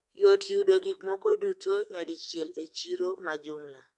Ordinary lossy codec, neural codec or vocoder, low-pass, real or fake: none; codec, 32 kHz, 1.9 kbps, SNAC; 10.8 kHz; fake